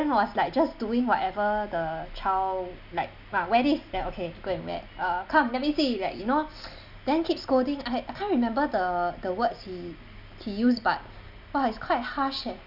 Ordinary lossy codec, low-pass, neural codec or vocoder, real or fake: none; 5.4 kHz; none; real